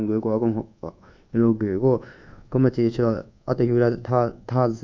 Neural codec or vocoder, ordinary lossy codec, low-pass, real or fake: codec, 24 kHz, 1.2 kbps, DualCodec; MP3, 64 kbps; 7.2 kHz; fake